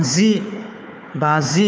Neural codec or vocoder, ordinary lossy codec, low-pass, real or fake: codec, 16 kHz, 4 kbps, FunCodec, trained on Chinese and English, 50 frames a second; none; none; fake